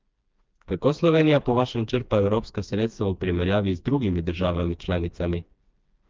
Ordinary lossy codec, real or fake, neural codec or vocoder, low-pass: Opus, 24 kbps; fake; codec, 16 kHz, 2 kbps, FreqCodec, smaller model; 7.2 kHz